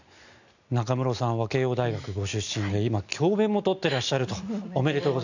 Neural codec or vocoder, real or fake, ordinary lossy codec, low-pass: none; real; none; 7.2 kHz